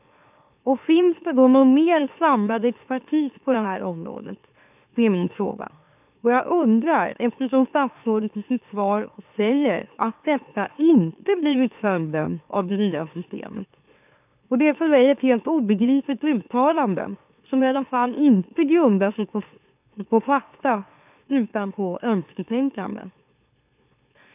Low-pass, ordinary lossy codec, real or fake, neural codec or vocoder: 3.6 kHz; none; fake; autoencoder, 44.1 kHz, a latent of 192 numbers a frame, MeloTTS